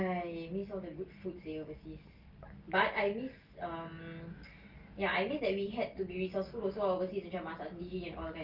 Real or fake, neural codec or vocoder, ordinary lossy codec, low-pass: real; none; Opus, 32 kbps; 5.4 kHz